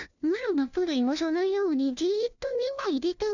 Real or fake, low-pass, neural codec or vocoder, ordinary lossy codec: fake; 7.2 kHz; codec, 16 kHz, 1 kbps, FunCodec, trained on LibriTTS, 50 frames a second; none